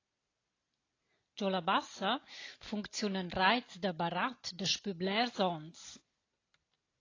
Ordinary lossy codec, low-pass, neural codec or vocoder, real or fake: AAC, 32 kbps; 7.2 kHz; none; real